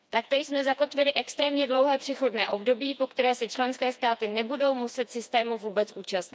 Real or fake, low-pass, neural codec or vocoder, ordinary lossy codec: fake; none; codec, 16 kHz, 2 kbps, FreqCodec, smaller model; none